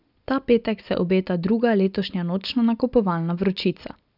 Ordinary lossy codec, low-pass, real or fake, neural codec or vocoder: none; 5.4 kHz; real; none